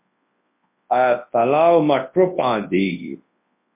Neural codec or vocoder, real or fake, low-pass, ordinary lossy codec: codec, 24 kHz, 0.9 kbps, WavTokenizer, large speech release; fake; 3.6 kHz; MP3, 24 kbps